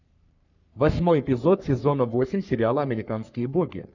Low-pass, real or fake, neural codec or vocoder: 7.2 kHz; fake; codec, 44.1 kHz, 3.4 kbps, Pupu-Codec